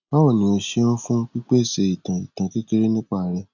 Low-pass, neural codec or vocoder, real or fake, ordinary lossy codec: 7.2 kHz; none; real; none